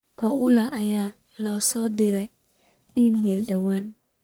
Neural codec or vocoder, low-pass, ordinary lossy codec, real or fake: codec, 44.1 kHz, 1.7 kbps, Pupu-Codec; none; none; fake